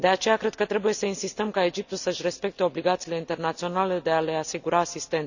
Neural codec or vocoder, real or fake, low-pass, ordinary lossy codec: none; real; 7.2 kHz; none